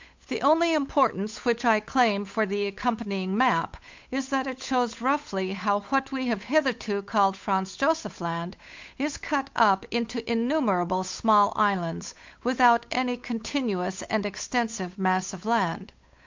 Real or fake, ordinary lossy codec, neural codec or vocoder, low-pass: fake; MP3, 64 kbps; codec, 16 kHz, 8 kbps, FunCodec, trained on Chinese and English, 25 frames a second; 7.2 kHz